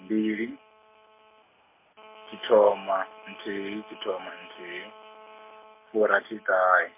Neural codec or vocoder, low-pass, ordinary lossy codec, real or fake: none; 3.6 kHz; MP3, 24 kbps; real